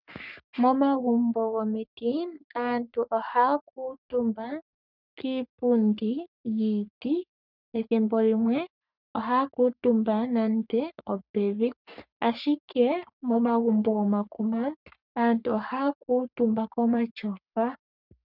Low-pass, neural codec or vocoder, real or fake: 5.4 kHz; codec, 44.1 kHz, 3.4 kbps, Pupu-Codec; fake